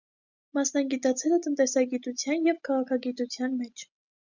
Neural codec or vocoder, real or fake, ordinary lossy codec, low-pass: none; real; Opus, 64 kbps; 7.2 kHz